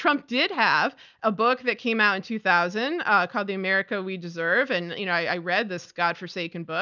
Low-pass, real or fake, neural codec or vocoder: 7.2 kHz; real; none